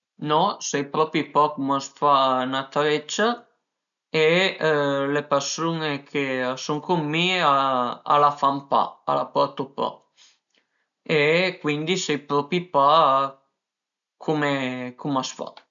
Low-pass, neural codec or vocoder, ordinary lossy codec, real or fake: 7.2 kHz; none; none; real